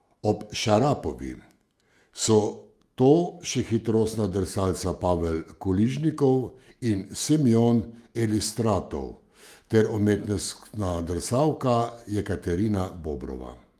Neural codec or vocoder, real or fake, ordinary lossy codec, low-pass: autoencoder, 48 kHz, 128 numbers a frame, DAC-VAE, trained on Japanese speech; fake; Opus, 32 kbps; 14.4 kHz